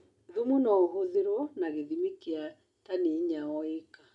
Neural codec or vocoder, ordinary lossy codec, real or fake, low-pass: none; none; real; 10.8 kHz